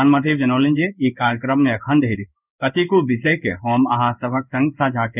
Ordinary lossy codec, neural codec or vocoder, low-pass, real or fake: none; codec, 16 kHz in and 24 kHz out, 1 kbps, XY-Tokenizer; 3.6 kHz; fake